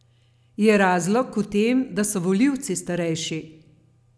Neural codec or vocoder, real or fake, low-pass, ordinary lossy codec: none; real; none; none